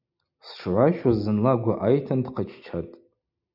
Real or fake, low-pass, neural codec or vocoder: real; 5.4 kHz; none